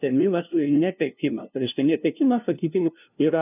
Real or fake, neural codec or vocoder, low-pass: fake; codec, 16 kHz, 0.5 kbps, FunCodec, trained on LibriTTS, 25 frames a second; 3.6 kHz